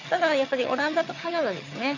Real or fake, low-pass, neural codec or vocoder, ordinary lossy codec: fake; 7.2 kHz; vocoder, 22.05 kHz, 80 mel bands, HiFi-GAN; AAC, 48 kbps